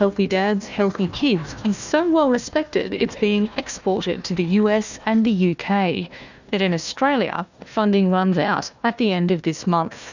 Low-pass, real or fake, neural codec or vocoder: 7.2 kHz; fake; codec, 16 kHz, 1 kbps, FunCodec, trained on Chinese and English, 50 frames a second